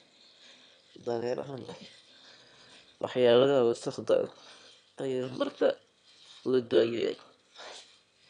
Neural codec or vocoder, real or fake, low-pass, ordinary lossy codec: autoencoder, 22.05 kHz, a latent of 192 numbers a frame, VITS, trained on one speaker; fake; 9.9 kHz; none